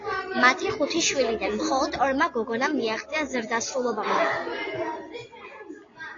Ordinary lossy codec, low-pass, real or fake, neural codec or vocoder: AAC, 32 kbps; 7.2 kHz; real; none